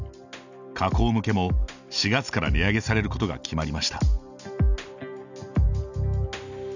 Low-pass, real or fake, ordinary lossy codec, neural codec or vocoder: 7.2 kHz; real; none; none